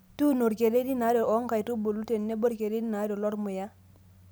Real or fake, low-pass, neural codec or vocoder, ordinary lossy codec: real; none; none; none